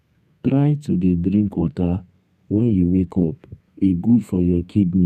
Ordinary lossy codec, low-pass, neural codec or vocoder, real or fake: none; 14.4 kHz; codec, 32 kHz, 1.9 kbps, SNAC; fake